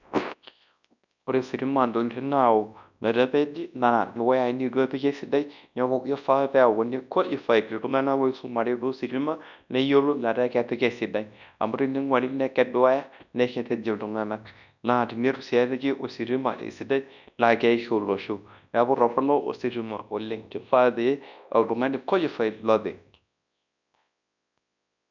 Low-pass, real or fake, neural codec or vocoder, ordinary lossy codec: 7.2 kHz; fake; codec, 24 kHz, 0.9 kbps, WavTokenizer, large speech release; none